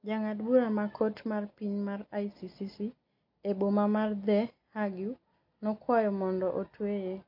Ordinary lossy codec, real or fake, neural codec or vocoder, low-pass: MP3, 32 kbps; real; none; 5.4 kHz